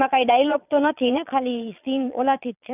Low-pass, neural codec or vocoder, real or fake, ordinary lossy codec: 3.6 kHz; vocoder, 22.05 kHz, 80 mel bands, Vocos; fake; none